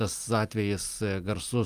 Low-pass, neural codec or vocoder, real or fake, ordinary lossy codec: 14.4 kHz; none; real; Opus, 32 kbps